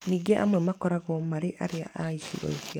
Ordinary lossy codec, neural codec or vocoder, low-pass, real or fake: none; codec, 44.1 kHz, 7.8 kbps, DAC; 19.8 kHz; fake